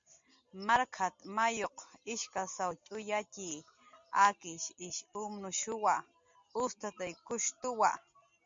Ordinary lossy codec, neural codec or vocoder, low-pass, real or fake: MP3, 48 kbps; none; 7.2 kHz; real